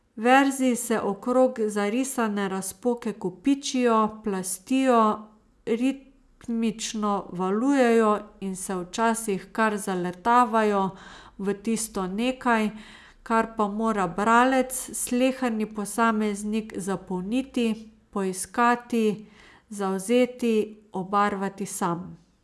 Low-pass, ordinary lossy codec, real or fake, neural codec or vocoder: none; none; real; none